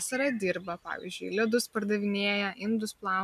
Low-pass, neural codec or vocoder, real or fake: 14.4 kHz; none; real